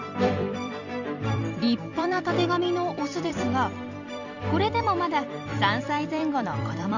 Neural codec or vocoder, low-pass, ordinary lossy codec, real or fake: vocoder, 44.1 kHz, 128 mel bands every 256 samples, BigVGAN v2; 7.2 kHz; none; fake